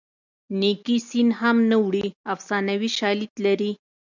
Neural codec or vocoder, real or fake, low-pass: none; real; 7.2 kHz